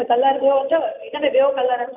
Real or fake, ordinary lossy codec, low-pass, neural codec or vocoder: real; none; 3.6 kHz; none